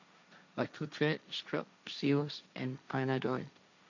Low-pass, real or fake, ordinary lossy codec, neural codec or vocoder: 7.2 kHz; fake; none; codec, 16 kHz, 1.1 kbps, Voila-Tokenizer